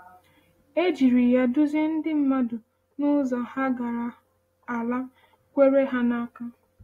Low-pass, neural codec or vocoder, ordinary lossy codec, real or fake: 19.8 kHz; none; AAC, 48 kbps; real